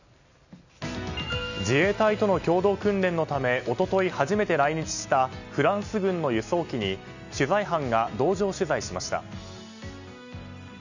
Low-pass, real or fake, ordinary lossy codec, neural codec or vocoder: 7.2 kHz; real; none; none